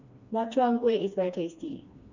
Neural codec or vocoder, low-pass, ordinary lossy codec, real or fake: codec, 16 kHz, 2 kbps, FreqCodec, smaller model; 7.2 kHz; none; fake